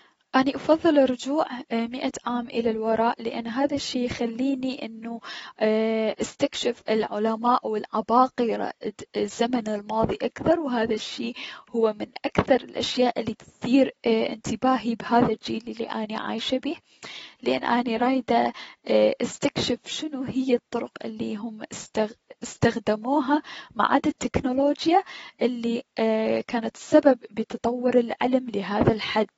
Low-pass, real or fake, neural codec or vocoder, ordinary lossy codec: 19.8 kHz; real; none; AAC, 24 kbps